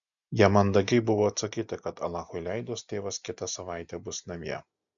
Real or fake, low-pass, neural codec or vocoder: real; 7.2 kHz; none